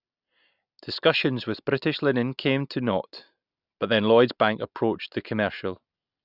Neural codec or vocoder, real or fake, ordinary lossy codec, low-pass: none; real; none; 5.4 kHz